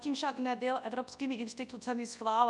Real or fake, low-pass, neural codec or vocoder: fake; 10.8 kHz; codec, 24 kHz, 0.9 kbps, WavTokenizer, large speech release